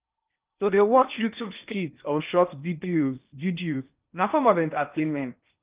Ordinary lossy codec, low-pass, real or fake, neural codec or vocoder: Opus, 24 kbps; 3.6 kHz; fake; codec, 16 kHz in and 24 kHz out, 0.6 kbps, FocalCodec, streaming, 2048 codes